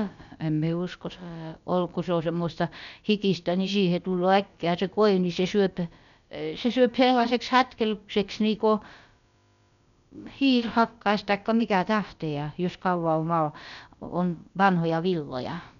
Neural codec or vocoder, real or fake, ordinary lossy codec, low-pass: codec, 16 kHz, about 1 kbps, DyCAST, with the encoder's durations; fake; none; 7.2 kHz